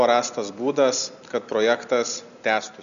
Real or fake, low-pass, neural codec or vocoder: real; 7.2 kHz; none